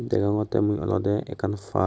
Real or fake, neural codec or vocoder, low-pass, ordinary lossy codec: real; none; none; none